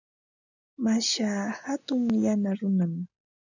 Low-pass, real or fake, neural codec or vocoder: 7.2 kHz; real; none